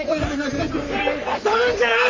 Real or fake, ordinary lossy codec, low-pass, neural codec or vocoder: fake; MP3, 48 kbps; 7.2 kHz; codec, 44.1 kHz, 3.4 kbps, Pupu-Codec